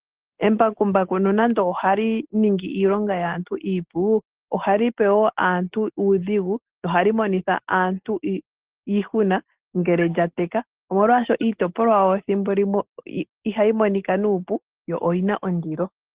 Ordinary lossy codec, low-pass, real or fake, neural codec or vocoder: Opus, 16 kbps; 3.6 kHz; real; none